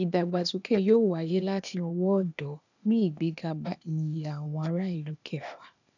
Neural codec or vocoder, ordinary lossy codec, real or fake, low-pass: codec, 16 kHz, 0.8 kbps, ZipCodec; none; fake; 7.2 kHz